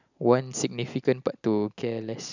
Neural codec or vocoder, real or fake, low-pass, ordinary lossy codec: none; real; 7.2 kHz; none